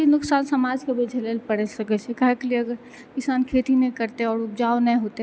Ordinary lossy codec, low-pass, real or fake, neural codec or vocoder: none; none; real; none